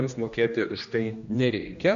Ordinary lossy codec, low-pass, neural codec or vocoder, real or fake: MP3, 64 kbps; 7.2 kHz; codec, 16 kHz, 1 kbps, X-Codec, HuBERT features, trained on general audio; fake